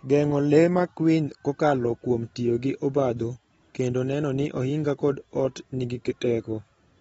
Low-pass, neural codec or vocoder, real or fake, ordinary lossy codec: 19.8 kHz; none; real; AAC, 24 kbps